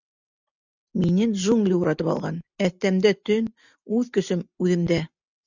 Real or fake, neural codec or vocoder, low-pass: real; none; 7.2 kHz